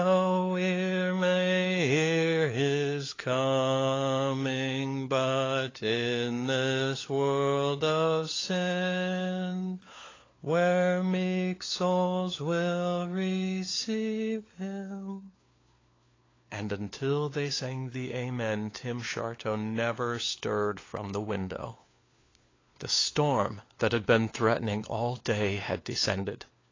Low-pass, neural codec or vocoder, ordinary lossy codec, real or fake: 7.2 kHz; codec, 16 kHz, 4 kbps, FunCodec, trained on LibriTTS, 50 frames a second; AAC, 32 kbps; fake